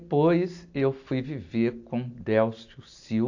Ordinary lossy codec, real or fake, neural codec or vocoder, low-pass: AAC, 48 kbps; real; none; 7.2 kHz